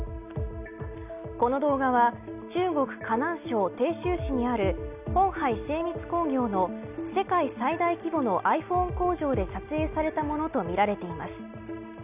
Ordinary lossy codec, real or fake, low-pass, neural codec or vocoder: none; real; 3.6 kHz; none